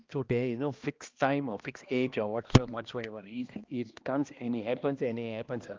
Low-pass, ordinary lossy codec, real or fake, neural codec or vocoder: 7.2 kHz; Opus, 24 kbps; fake; codec, 16 kHz, 1 kbps, X-Codec, HuBERT features, trained on balanced general audio